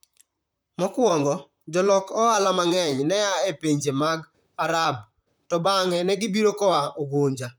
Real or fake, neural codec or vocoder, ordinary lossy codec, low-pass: fake; vocoder, 44.1 kHz, 128 mel bands, Pupu-Vocoder; none; none